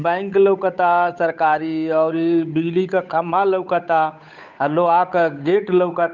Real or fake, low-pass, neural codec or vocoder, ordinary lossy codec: fake; 7.2 kHz; codec, 16 kHz, 8 kbps, FunCodec, trained on Chinese and English, 25 frames a second; none